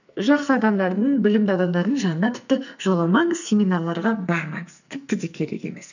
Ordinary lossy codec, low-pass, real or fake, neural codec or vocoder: none; 7.2 kHz; fake; codec, 44.1 kHz, 2.6 kbps, SNAC